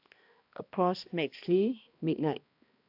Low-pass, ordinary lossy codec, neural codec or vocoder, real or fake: 5.4 kHz; AAC, 48 kbps; codec, 16 kHz, 1 kbps, X-Codec, HuBERT features, trained on balanced general audio; fake